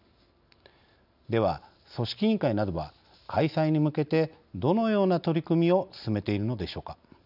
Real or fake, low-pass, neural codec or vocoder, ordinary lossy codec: real; 5.4 kHz; none; none